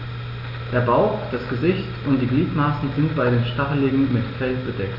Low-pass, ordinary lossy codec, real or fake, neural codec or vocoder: 5.4 kHz; none; real; none